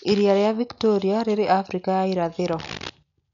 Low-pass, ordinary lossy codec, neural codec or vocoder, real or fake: 7.2 kHz; none; none; real